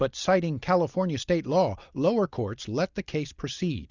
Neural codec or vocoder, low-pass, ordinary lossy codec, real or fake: none; 7.2 kHz; Opus, 64 kbps; real